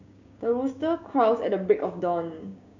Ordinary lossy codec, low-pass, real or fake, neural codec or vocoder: none; 7.2 kHz; fake; codec, 16 kHz, 6 kbps, DAC